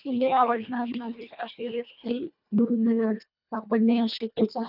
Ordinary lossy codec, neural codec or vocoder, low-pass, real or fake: none; codec, 24 kHz, 1.5 kbps, HILCodec; 5.4 kHz; fake